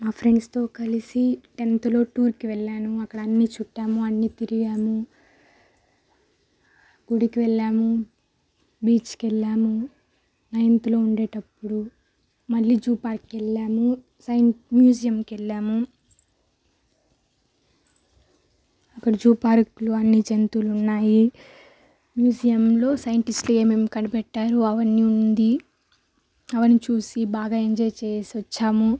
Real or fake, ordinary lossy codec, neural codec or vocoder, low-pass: real; none; none; none